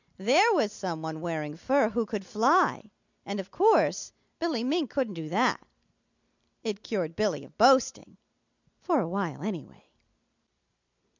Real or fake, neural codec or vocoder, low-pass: real; none; 7.2 kHz